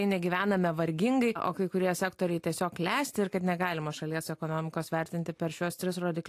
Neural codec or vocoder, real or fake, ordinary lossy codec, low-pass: none; real; AAC, 64 kbps; 14.4 kHz